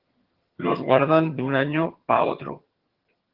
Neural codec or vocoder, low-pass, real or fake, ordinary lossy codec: vocoder, 22.05 kHz, 80 mel bands, HiFi-GAN; 5.4 kHz; fake; Opus, 16 kbps